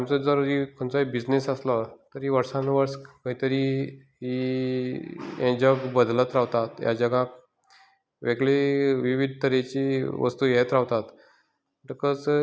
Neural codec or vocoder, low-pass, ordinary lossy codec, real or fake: none; none; none; real